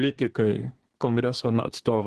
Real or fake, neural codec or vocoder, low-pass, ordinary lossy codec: fake; codec, 24 kHz, 1 kbps, SNAC; 10.8 kHz; Opus, 16 kbps